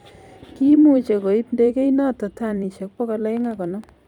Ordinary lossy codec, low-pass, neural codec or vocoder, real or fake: none; 19.8 kHz; vocoder, 44.1 kHz, 128 mel bands every 256 samples, BigVGAN v2; fake